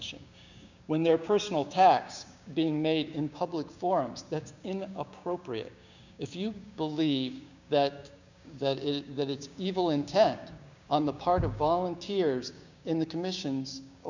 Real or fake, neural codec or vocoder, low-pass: fake; codec, 16 kHz, 6 kbps, DAC; 7.2 kHz